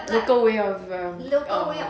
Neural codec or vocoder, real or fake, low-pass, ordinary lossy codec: none; real; none; none